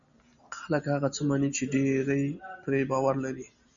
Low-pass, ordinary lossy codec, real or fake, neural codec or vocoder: 7.2 kHz; MP3, 64 kbps; real; none